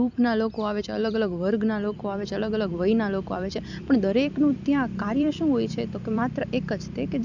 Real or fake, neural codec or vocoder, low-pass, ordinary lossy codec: fake; vocoder, 44.1 kHz, 128 mel bands every 256 samples, BigVGAN v2; 7.2 kHz; none